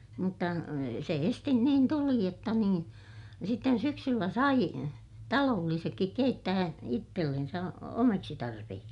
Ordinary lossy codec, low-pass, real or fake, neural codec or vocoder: none; 10.8 kHz; real; none